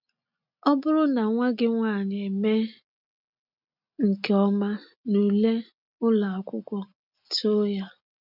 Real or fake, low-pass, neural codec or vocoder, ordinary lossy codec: real; 5.4 kHz; none; none